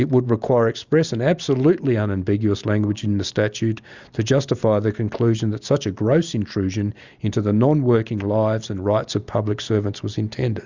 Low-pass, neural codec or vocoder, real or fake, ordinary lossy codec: 7.2 kHz; none; real; Opus, 64 kbps